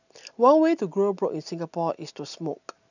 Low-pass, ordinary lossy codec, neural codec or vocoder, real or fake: 7.2 kHz; none; none; real